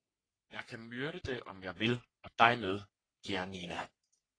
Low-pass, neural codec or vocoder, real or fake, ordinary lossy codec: 9.9 kHz; codec, 44.1 kHz, 3.4 kbps, Pupu-Codec; fake; AAC, 32 kbps